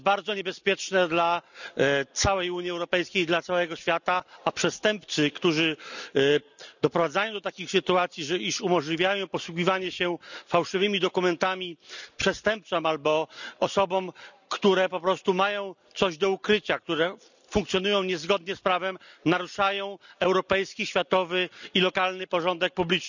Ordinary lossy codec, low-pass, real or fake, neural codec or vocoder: none; 7.2 kHz; real; none